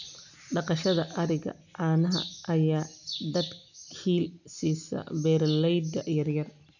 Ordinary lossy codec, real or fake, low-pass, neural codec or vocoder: none; real; 7.2 kHz; none